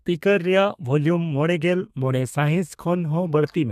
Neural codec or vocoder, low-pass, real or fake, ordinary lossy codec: codec, 32 kHz, 1.9 kbps, SNAC; 14.4 kHz; fake; MP3, 96 kbps